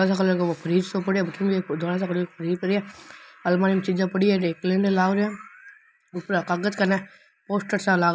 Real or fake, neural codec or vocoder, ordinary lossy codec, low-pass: real; none; none; none